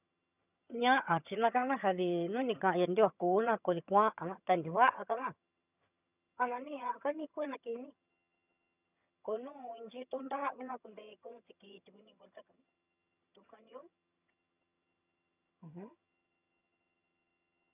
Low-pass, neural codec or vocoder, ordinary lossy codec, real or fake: 3.6 kHz; vocoder, 22.05 kHz, 80 mel bands, HiFi-GAN; none; fake